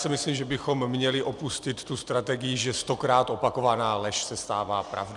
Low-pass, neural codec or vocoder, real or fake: 10.8 kHz; none; real